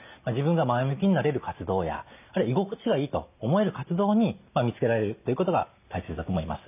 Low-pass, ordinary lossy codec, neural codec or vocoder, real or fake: 3.6 kHz; none; none; real